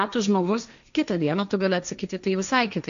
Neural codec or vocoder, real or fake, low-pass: codec, 16 kHz, 1.1 kbps, Voila-Tokenizer; fake; 7.2 kHz